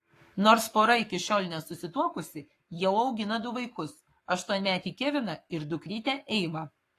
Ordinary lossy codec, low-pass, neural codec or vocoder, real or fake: AAC, 48 kbps; 14.4 kHz; codec, 44.1 kHz, 7.8 kbps, Pupu-Codec; fake